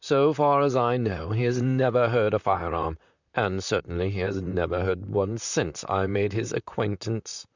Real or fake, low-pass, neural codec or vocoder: fake; 7.2 kHz; vocoder, 44.1 kHz, 128 mel bands, Pupu-Vocoder